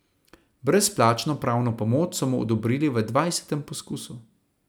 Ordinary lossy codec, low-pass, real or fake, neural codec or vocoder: none; none; real; none